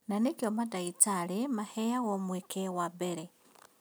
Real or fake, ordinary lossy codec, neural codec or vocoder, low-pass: real; none; none; none